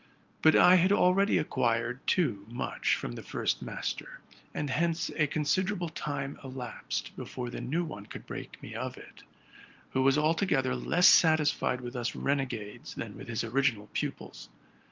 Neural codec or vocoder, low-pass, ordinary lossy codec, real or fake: none; 7.2 kHz; Opus, 24 kbps; real